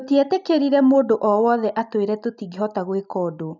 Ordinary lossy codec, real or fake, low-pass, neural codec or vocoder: none; real; 7.2 kHz; none